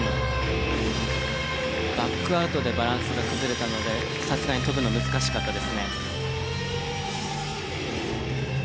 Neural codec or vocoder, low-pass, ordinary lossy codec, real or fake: none; none; none; real